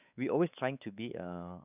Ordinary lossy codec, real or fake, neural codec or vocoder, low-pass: none; fake; codec, 16 kHz, 4 kbps, X-Codec, WavLM features, trained on Multilingual LibriSpeech; 3.6 kHz